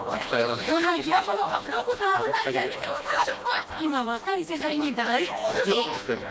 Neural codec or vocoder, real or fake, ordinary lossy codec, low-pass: codec, 16 kHz, 1 kbps, FreqCodec, smaller model; fake; none; none